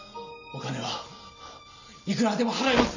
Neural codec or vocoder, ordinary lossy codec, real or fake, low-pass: none; none; real; 7.2 kHz